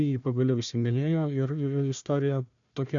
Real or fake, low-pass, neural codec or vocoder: fake; 7.2 kHz; codec, 16 kHz, 1 kbps, FunCodec, trained on Chinese and English, 50 frames a second